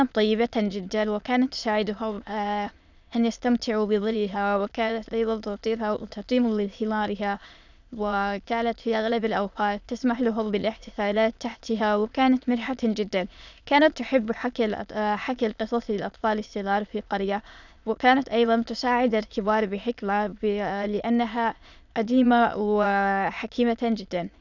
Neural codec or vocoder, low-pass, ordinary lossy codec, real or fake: autoencoder, 22.05 kHz, a latent of 192 numbers a frame, VITS, trained on many speakers; 7.2 kHz; none; fake